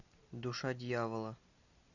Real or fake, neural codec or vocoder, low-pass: real; none; 7.2 kHz